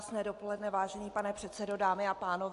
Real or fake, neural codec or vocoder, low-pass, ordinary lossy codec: real; none; 10.8 kHz; AAC, 64 kbps